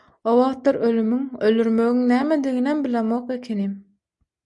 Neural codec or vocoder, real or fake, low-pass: none; real; 10.8 kHz